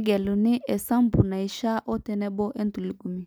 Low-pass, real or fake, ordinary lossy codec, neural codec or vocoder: none; real; none; none